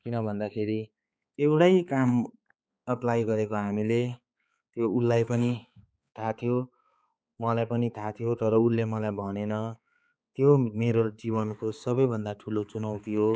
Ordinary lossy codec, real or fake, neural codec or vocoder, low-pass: none; fake; codec, 16 kHz, 4 kbps, X-Codec, HuBERT features, trained on balanced general audio; none